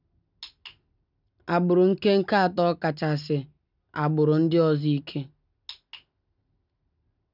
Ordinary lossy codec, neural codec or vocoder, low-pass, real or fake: none; none; 5.4 kHz; real